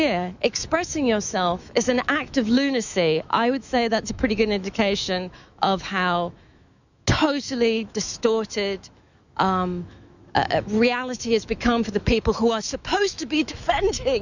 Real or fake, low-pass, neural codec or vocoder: fake; 7.2 kHz; autoencoder, 48 kHz, 128 numbers a frame, DAC-VAE, trained on Japanese speech